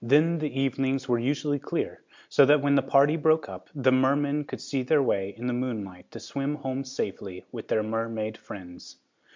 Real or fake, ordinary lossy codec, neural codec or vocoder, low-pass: real; MP3, 64 kbps; none; 7.2 kHz